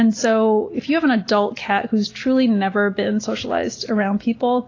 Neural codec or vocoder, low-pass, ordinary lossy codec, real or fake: none; 7.2 kHz; AAC, 32 kbps; real